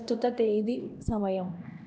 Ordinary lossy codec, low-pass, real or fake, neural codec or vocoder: none; none; fake; codec, 16 kHz, 1 kbps, X-Codec, HuBERT features, trained on LibriSpeech